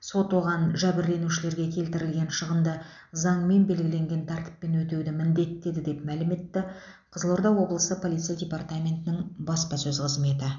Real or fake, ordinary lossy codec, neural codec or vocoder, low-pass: real; none; none; 7.2 kHz